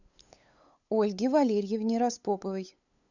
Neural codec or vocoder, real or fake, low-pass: codec, 16 kHz, 8 kbps, FunCodec, trained on LibriTTS, 25 frames a second; fake; 7.2 kHz